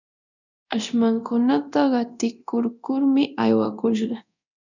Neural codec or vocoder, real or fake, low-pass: codec, 24 kHz, 0.9 kbps, DualCodec; fake; 7.2 kHz